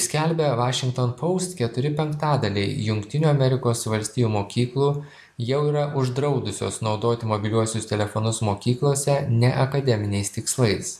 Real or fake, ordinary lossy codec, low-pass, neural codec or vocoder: real; AAC, 96 kbps; 14.4 kHz; none